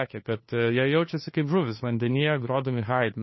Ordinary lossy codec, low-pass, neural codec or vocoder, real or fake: MP3, 24 kbps; 7.2 kHz; codec, 16 kHz, 2 kbps, FunCodec, trained on Chinese and English, 25 frames a second; fake